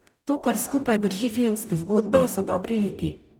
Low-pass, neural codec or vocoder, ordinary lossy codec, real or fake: none; codec, 44.1 kHz, 0.9 kbps, DAC; none; fake